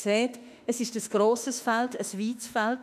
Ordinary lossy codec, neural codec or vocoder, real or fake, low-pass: none; autoencoder, 48 kHz, 32 numbers a frame, DAC-VAE, trained on Japanese speech; fake; 14.4 kHz